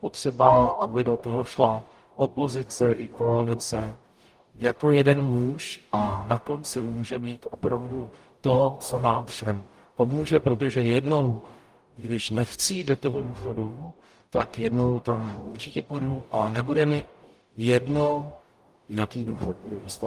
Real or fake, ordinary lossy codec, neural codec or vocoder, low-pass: fake; Opus, 32 kbps; codec, 44.1 kHz, 0.9 kbps, DAC; 14.4 kHz